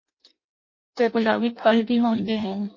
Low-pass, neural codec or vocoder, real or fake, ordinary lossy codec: 7.2 kHz; codec, 16 kHz in and 24 kHz out, 0.6 kbps, FireRedTTS-2 codec; fake; MP3, 32 kbps